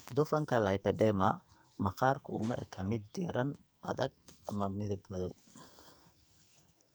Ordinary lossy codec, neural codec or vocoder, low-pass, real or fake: none; codec, 44.1 kHz, 2.6 kbps, SNAC; none; fake